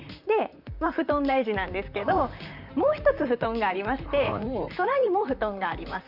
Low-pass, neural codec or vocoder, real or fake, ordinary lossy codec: 5.4 kHz; vocoder, 44.1 kHz, 128 mel bands, Pupu-Vocoder; fake; none